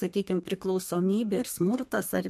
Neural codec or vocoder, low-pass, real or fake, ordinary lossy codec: codec, 32 kHz, 1.9 kbps, SNAC; 14.4 kHz; fake; MP3, 64 kbps